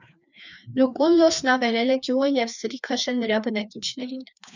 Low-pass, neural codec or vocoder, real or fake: 7.2 kHz; codec, 16 kHz, 2 kbps, FreqCodec, larger model; fake